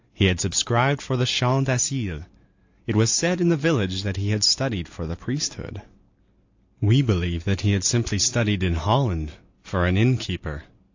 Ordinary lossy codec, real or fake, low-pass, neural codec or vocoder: AAC, 48 kbps; real; 7.2 kHz; none